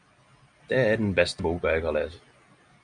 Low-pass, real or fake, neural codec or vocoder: 9.9 kHz; real; none